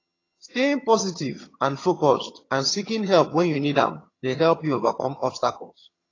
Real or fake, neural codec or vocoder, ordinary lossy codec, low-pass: fake; vocoder, 22.05 kHz, 80 mel bands, HiFi-GAN; AAC, 32 kbps; 7.2 kHz